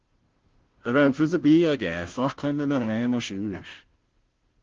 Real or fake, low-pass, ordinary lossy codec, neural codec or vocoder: fake; 7.2 kHz; Opus, 16 kbps; codec, 16 kHz, 0.5 kbps, FunCodec, trained on Chinese and English, 25 frames a second